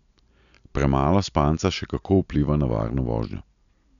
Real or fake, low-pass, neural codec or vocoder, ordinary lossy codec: real; 7.2 kHz; none; none